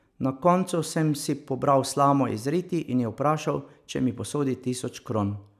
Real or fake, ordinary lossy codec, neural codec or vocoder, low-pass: real; none; none; 14.4 kHz